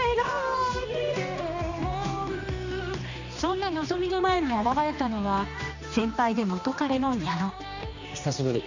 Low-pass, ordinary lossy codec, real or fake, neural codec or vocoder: 7.2 kHz; none; fake; codec, 16 kHz, 2 kbps, X-Codec, HuBERT features, trained on general audio